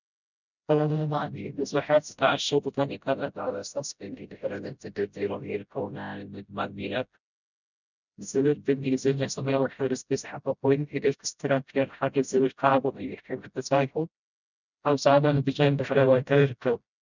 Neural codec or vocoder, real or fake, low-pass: codec, 16 kHz, 0.5 kbps, FreqCodec, smaller model; fake; 7.2 kHz